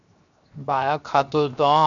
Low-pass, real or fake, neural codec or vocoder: 7.2 kHz; fake; codec, 16 kHz, 0.7 kbps, FocalCodec